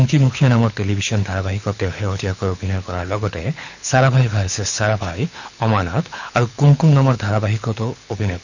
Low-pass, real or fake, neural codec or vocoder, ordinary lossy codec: 7.2 kHz; fake; codec, 24 kHz, 6 kbps, HILCodec; none